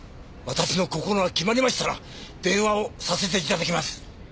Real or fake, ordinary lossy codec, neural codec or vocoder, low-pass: real; none; none; none